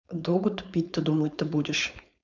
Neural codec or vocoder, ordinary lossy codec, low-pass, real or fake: codec, 16 kHz, 4.8 kbps, FACodec; none; 7.2 kHz; fake